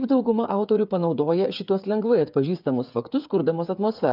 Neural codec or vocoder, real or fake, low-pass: codec, 16 kHz, 8 kbps, FreqCodec, smaller model; fake; 5.4 kHz